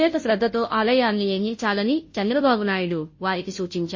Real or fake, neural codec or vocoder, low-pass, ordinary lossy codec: fake; codec, 16 kHz, 0.5 kbps, FunCodec, trained on Chinese and English, 25 frames a second; 7.2 kHz; MP3, 32 kbps